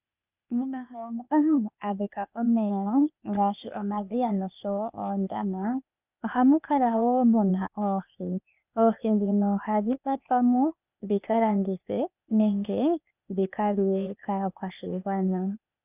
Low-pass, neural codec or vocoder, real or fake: 3.6 kHz; codec, 16 kHz, 0.8 kbps, ZipCodec; fake